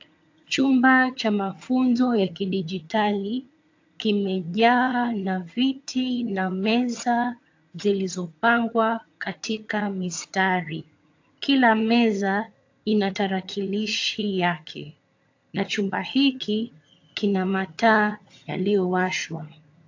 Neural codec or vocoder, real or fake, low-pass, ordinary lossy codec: vocoder, 22.05 kHz, 80 mel bands, HiFi-GAN; fake; 7.2 kHz; AAC, 48 kbps